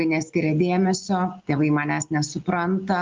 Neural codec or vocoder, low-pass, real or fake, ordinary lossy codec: none; 7.2 kHz; real; Opus, 32 kbps